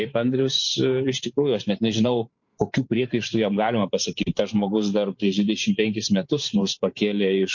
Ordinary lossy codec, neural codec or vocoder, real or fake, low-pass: MP3, 48 kbps; vocoder, 44.1 kHz, 128 mel bands every 512 samples, BigVGAN v2; fake; 7.2 kHz